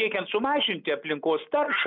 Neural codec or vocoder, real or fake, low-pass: none; real; 5.4 kHz